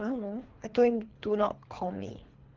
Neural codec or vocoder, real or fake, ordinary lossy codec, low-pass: codec, 24 kHz, 3 kbps, HILCodec; fake; Opus, 16 kbps; 7.2 kHz